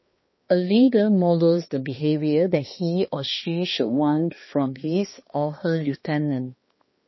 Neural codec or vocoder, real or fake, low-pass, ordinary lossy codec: codec, 16 kHz, 2 kbps, X-Codec, HuBERT features, trained on balanced general audio; fake; 7.2 kHz; MP3, 24 kbps